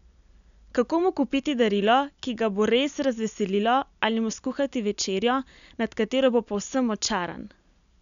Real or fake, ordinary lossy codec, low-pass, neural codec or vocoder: real; none; 7.2 kHz; none